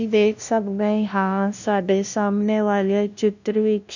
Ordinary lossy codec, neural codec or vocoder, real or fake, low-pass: none; codec, 16 kHz, 0.5 kbps, FunCodec, trained on LibriTTS, 25 frames a second; fake; 7.2 kHz